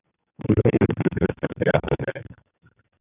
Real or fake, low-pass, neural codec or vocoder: fake; 3.6 kHz; codec, 44.1 kHz, 2.6 kbps, SNAC